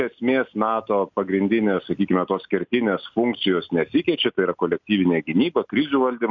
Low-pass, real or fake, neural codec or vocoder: 7.2 kHz; real; none